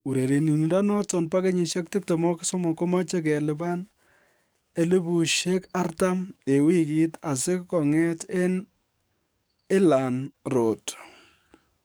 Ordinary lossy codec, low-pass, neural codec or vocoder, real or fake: none; none; codec, 44.1 kHz, 7.8 kbps, DAC; fake